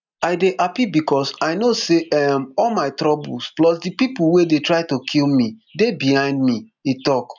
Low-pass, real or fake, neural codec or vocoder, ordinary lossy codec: 7.2 kHz; real; none; none